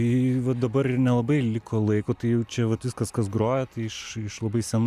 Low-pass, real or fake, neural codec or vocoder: 14.4 kHz; real; none